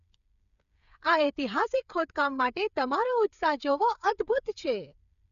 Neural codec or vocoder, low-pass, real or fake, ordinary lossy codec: codec, 16 kHz, 8 kbps, FreqCodec, smaller model; 7.2 kHz; fake; none